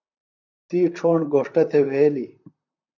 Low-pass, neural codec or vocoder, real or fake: 7.2 kHz; vocoder, 44.1 kHz, 128 mel bands, Pupu-Vocoder; fake